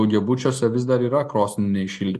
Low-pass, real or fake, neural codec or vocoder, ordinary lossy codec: 14.4 kHz; real; none; MP3, 64 kbps